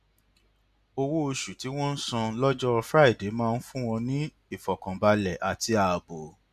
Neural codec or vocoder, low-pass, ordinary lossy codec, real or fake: none; 14.4 kHz; none; real